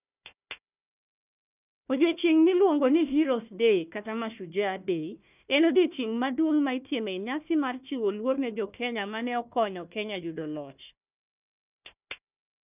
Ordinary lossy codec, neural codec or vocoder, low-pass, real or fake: none; codec, 16 kHz, 1 kbps, FunCodec, trained on Chinese and English, 50 frames a second; 3.6 kHz; fake